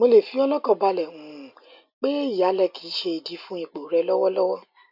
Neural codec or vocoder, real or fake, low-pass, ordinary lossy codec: none; real; 5.4 kHz; none